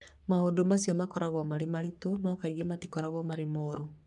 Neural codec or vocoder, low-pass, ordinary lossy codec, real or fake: codec, 44.1 kHz, 3.4 kbps, Pupu-Codec; 10.8 kHz; none; fake